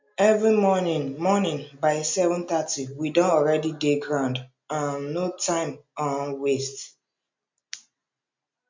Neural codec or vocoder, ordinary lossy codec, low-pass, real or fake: none; MP3, 64 kbps; 7.2 kHz; real